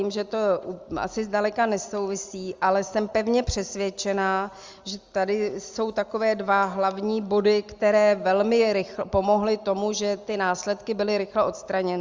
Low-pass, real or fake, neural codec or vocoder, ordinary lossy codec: 7.2 kHz; real; none; Opus, 32 kbps